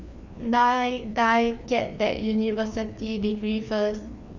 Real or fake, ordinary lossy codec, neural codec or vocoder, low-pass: fake; none; codec, 16 kHz, 2 kbps, FreqCodec, larger model; 7.2 kHz